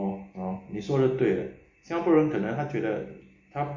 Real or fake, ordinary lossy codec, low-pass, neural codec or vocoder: real; MP3, 32 kbps; 7.2 kHz; none